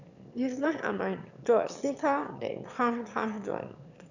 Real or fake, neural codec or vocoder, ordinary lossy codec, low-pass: fake; autoencoder, 22.05 kHz, a latent of 192 numbers a frame, VITS, trained on one speaker; none; 7.2 kHz